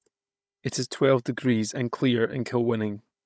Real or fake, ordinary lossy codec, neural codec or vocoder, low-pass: fake; none; codec, 16 kHz, 16 kbps, FunCodec, trained on Chinese and English, 50 frames a second; none